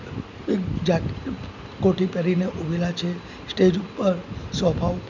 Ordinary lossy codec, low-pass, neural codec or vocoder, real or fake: none; 7.2 kHz; none; real